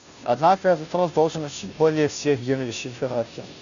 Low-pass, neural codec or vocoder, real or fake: 7.2 kHz; codec, 16 kHz, 0.5 kbps, FunCodec, trained on Chinese and English, 25 frames a second; fake